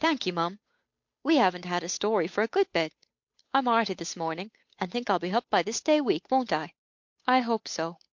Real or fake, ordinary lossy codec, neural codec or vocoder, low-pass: fake; MP3, 48 kbps; codec, 16 kHz, 8 kbps, FunCodec, trained on Chinese and English, 25 frames a second; 7.2 kHz